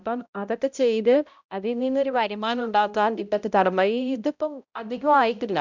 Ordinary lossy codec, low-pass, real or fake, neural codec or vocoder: none; 7.2 kHz; fake; codec, 16 kHz, 0.5 kbps, X-Codec, HuBERT features, trained on balanced general audio